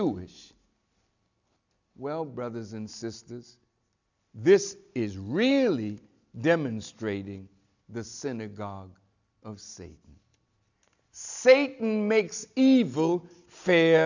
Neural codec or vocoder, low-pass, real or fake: none; 7.2 kHz; real